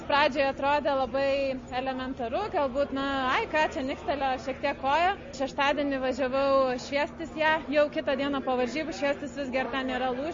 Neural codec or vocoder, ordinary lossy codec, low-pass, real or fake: none; MP3, 32 kbps; 7.2 kHz; real